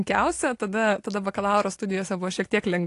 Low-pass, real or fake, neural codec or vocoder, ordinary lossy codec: 10.8 kHz; real; none; AAC, 48 kbps